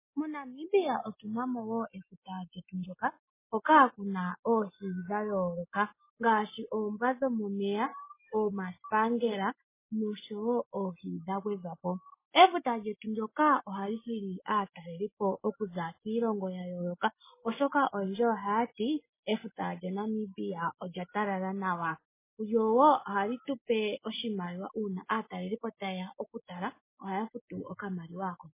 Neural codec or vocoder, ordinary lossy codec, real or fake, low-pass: none; MP3, 16 kbps; real; 3.6 kHz